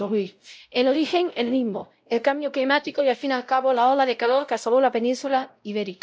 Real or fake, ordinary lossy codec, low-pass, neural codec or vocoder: fake; none; none; codec, 16 kHz, 0.5 kbps, X-Codec, WavLM features, trained on Multilingual LibriSpeech